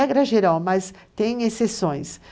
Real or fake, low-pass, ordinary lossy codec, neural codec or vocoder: real; none; none; none